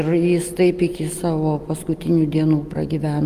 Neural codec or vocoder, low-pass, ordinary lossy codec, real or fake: none; 14.4 kHz; Opus, 32 kbps; real